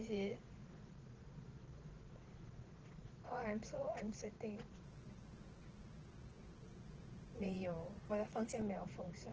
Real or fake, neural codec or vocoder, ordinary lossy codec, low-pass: fake; vocoder, 22.05 kHz, 80 mel bands, Vocos; Opus, 16 kbps; 7.2 kHz